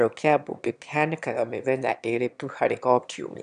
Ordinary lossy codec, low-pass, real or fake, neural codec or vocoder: none; 9.9 kHz; fake; autoencoder, 22.05 kHz, a latent of 192 numbers a frame, VITS, trained on one speaker